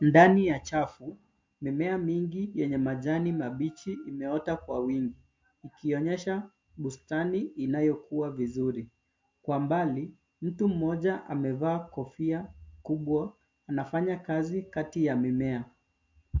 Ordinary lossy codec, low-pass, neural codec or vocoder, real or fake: MP3, 48 kbps; 7.2 kHz; none; real